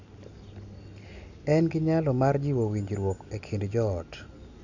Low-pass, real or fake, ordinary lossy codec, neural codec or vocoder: 7.2 kHz; real; none; none